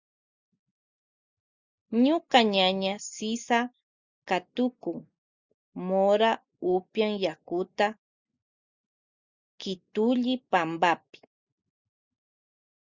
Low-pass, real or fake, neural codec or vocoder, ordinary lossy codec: 7.2 kHz; real; none; Opus, 64 kbps